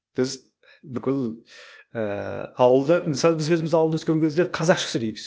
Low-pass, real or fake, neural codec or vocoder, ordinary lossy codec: none; fake; codec, 16 kHz, 0.8 kbps, ZipCodec; none